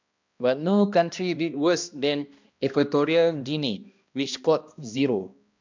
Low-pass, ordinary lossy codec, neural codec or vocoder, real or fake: 7.2 kHz; MP3, 64 kbps; codec, 16 kHz, 1 kbps, X-Codec, HuBERT features, trained on balanced general audio; fake